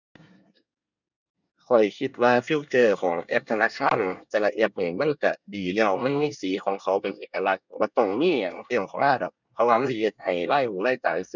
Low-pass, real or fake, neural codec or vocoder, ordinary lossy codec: 7.2 kHz; fake; codec, 24 kHz, 1 kbps, SNAC; none